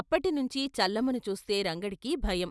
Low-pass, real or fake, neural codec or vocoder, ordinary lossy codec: none; real; none; none